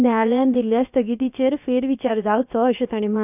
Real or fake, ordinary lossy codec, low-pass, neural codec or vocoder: fake; none; 3.6 kHz; codec, 16 kHz, about 1 kbps, DyCAST, with the encoder's durations